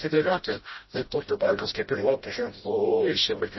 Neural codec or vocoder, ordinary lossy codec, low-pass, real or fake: codec, 16 kHz, 0.5 kbps, FreqCodec, smaller model; MP3, 24 kbps; 7.2 kHz; fake